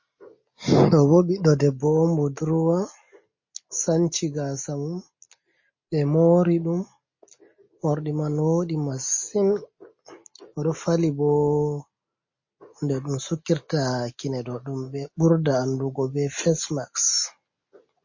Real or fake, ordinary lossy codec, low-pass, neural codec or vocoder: real; MP3, 32 kbps; 7.2 kHz; none